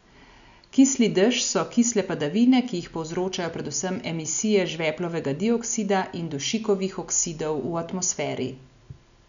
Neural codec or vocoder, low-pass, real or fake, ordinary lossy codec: none; 7.2 kHz; real; none